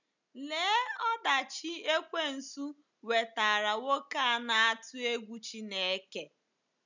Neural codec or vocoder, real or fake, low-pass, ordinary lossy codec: none; real; 7.2 kHz; none